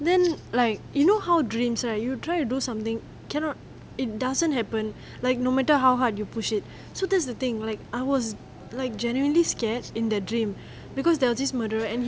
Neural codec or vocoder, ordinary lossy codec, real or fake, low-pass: none; none; real; none